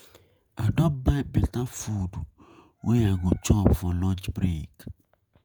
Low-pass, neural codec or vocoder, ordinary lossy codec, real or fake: none; vocoder, 48 kHz, 128 mel bands, Vocos; none; fake